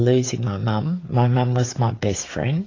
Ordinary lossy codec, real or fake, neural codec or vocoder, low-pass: AAC, 32 kbps; fake; codec, 16 kHz, 16 kbps, FreqCodec, smaller model; 7.2 kHz